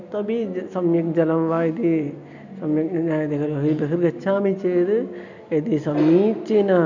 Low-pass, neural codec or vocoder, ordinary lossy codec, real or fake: 7.2 kHz; none; none; real